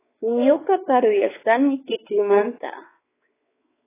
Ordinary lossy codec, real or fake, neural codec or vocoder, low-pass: AAC, 16 kbps; fake; codec, 16 kHz, 4 kbps, X-Codec, WavLM features, trained on Multilingual LibriSpeech; 3.6 kHz